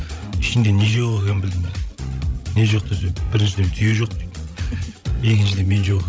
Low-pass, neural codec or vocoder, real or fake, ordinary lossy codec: none; codec, 16 kHz, 16 kbps, FreqCodec, larger model; fake; none